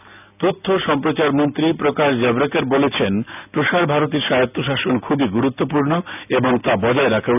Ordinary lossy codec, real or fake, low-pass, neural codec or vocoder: none; real; 3.6 kHz; none